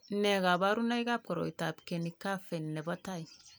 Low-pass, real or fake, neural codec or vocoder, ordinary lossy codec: none; real; none; none